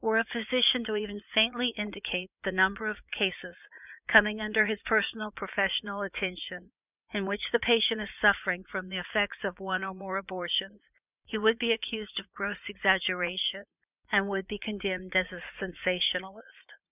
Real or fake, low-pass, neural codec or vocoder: fake; 3.6 kHz; vocoder, 44.1 kHz, 80 mel bands, Vocos